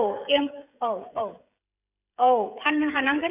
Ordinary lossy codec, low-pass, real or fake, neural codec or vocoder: none; 3.6 kHz; fake; codec, 16 kHz, 16 kbps, FreqCodec, smaller model